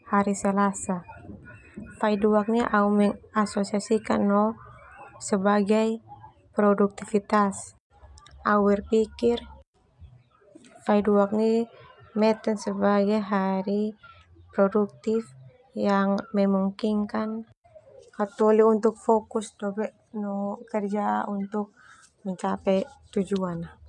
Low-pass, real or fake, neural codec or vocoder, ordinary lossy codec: 10.8 kHz; real; none; none